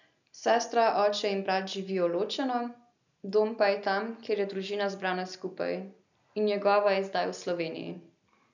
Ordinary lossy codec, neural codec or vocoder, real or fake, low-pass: none; none; real; 7.2 kHz